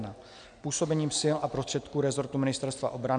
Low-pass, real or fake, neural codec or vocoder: 9.9 kHz; real; none